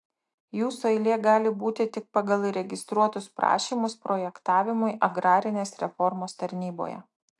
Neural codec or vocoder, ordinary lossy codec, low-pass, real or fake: none; AAC, 64 kbps; 10.8 kHz; real